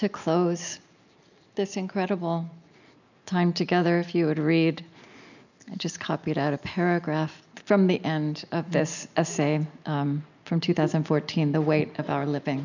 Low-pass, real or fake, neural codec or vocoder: 7.2 kHz; real; none